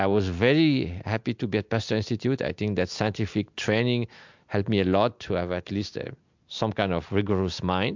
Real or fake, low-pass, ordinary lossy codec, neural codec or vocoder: real; 7.2 kHz; MP3, 64 kbps; none